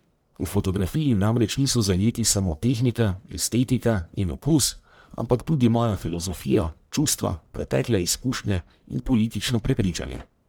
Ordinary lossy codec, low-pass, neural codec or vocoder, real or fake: none; none; codec, 44.1 kHz, 1.7 kbps, Pupu-Codec; fake